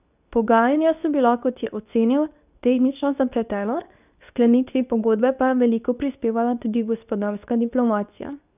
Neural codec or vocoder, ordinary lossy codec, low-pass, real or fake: codec, 24 kHz, 0.9 kbps, WavTokenizer, medium speech release version 2; none; 3.6 kHz; fake